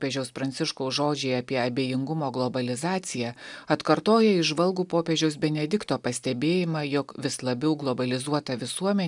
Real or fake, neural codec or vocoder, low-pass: real; none; 10.8 kHz